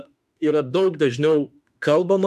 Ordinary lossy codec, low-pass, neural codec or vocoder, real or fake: AAC, 96 kbps; 14.4 kHz; autoencoder, 48 kHz, 32 numbers a frame, DAC-VAE, trained on Japanese speech; fake